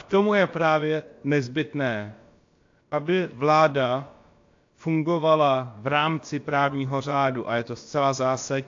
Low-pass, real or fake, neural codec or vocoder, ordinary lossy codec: 7.2 kHz; fake; codec, 16 kHz, about 1 kbps, DyCAST, with the encoder's durations; AAC, 48 kbps